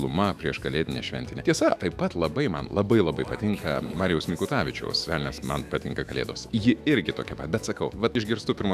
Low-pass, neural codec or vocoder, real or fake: 14.4 kHz; autoencoder, 48 kHz, 128 numbers a frame, DAC-VAE, trained on Japanese speech; fake